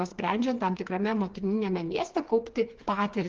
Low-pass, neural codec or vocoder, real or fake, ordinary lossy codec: 7.2 kHz; codec, 16 kHz, 4 kbps, FreqCodec, smaller model; fake; Opus, 24 kbps